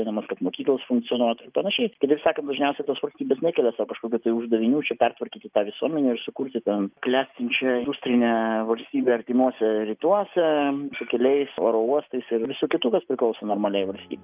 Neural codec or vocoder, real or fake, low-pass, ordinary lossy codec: none; real; 3.6 kHz; Opus, 24 kbps